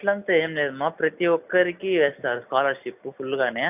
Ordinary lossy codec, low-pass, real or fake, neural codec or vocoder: none; 3.6 kHz; real; none